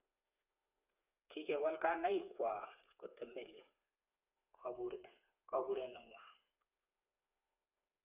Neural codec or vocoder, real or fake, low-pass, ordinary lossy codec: codec, 16 kHz, 4 kbps, FreqCodec, smaller model; fake; 3.6 kHz; none